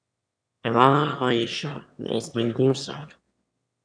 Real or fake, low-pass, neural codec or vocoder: fake; 9.9 kHz; autoencoder, 22.05 kHz, a latent of 192 numbers a frame, VITS, trained on one speaker